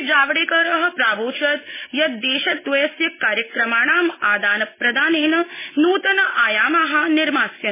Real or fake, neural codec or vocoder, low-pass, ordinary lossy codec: real; none; 3.6 kHz; MP3, 16 kbps